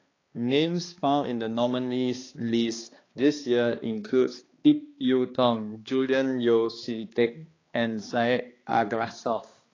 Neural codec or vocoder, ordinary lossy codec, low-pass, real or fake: codec, 16 kHz, 2 kbps, X-Codec, HuBERT features, trained on balanced general audio; AAC, 32 kbps; 7.2 kHz; fake